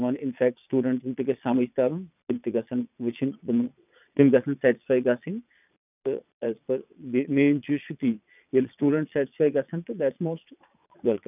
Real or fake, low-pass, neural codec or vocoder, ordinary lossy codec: fake; 3.6 kHz; vocoder, 22.05 kHz, 80 mel bands, Vocos; none